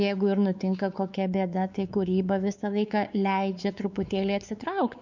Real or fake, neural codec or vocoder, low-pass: fake; codec, 16 kHz, 4 kbps, X-Codec, WavLM features, trained on Multilingual LibriSpeech; 7.2 kHz